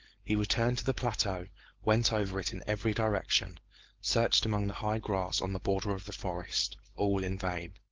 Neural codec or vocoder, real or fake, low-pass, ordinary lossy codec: codec, 16 kHz, 4.8 kbps, FACodec; fake; 7.2 kHz; Opus, 16 kbps